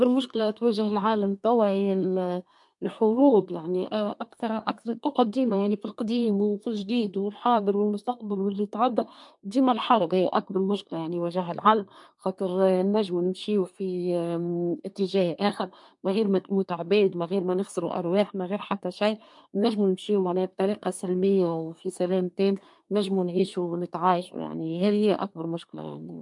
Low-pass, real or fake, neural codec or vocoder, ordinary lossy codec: 10.8 kHz; fake; codec, 24 kHz, 1 kbps, SNAC; MP3, 64 kbps